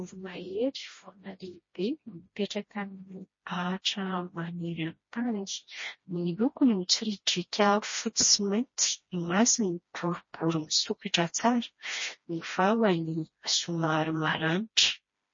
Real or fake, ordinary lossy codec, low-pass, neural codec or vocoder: fake; MP3, 32 kbps; 7.2 kHz; codec, 16 kHz, 1 kbps, FreqCodec, smaller model